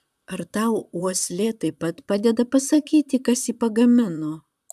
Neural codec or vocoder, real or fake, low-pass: vocoder, 44.1 kHz, 128 mel bands, Pupu-Vocoder; fake; 14.4 kHz